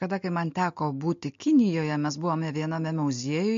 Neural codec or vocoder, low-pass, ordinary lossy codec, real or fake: none; 7.2 kHz; MP3, 48 kbps; real